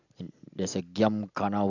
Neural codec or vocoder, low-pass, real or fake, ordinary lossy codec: none; 7.2 kHz; real; none